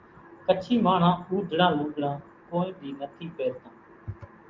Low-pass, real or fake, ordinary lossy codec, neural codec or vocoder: 7.2 kHz; real; Opus, 32 kbps; none